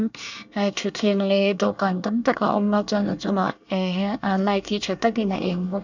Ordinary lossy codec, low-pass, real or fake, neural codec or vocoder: none; 7.2 kHz; fake; codec, 24 kHz, 1 kbps, SNAC